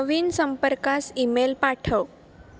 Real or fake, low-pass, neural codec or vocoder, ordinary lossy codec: real; none; none; none